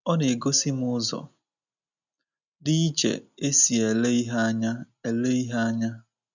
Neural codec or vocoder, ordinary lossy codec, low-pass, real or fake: none; none; 7.2 kHz; real